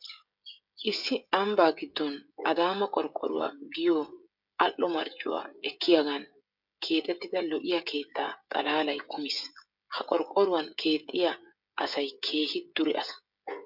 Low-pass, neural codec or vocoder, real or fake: 5.4 kHz; codec, 16 kHz, 16 kbps, FreqCodec, smaller model; fake